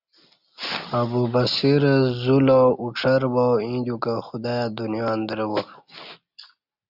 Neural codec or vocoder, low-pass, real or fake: none; 5.4 kHz; real